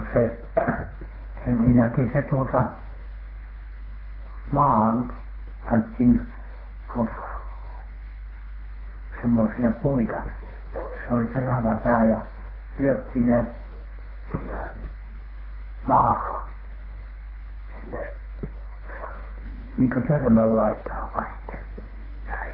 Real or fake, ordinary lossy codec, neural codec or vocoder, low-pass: fake; AAC, 24 kbps; codec, 24 kHz, 3 kbps, HILCodec; 5.4 kHz